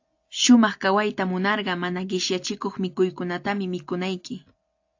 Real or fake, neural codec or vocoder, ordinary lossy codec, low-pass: real; none; AAC, 48 kbps; 7.2 kHz